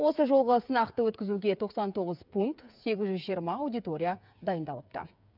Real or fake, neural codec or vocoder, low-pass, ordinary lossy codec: fake; codec, 16 kHz, 8 kbps, FreqCodec, smaller model; 5.4 kHz; none